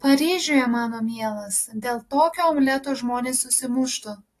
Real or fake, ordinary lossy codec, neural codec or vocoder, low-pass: real; AAC, 48 kbps; none; 14.4 kHz